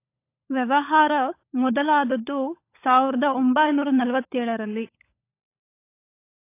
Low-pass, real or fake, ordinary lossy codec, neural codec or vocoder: 3.6 kHz; fake; AAC, 24 kbps; codec, 16 kHz, 16 kbps, FunCodec, trained on LibriTTS, 50 frames a second